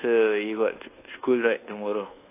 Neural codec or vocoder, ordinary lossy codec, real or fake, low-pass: codec, 24 kHz, 1.2 kbps, DualCodec; AAC, 24 kbps; fake; 3.6 kHz